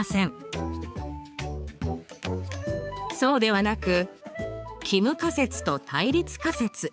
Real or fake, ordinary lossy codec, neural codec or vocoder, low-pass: fake; none; codec, 16 kHz, 4 kbps, X-Codec, HuBERT features, trained on balanced general audio; none